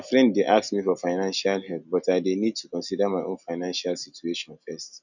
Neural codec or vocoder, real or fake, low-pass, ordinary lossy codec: none; real; 7.2 kHz; none